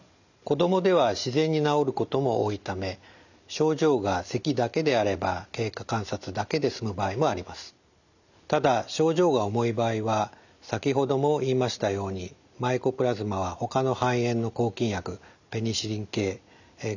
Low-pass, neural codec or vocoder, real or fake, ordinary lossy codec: 7.2 kHz; none; real; none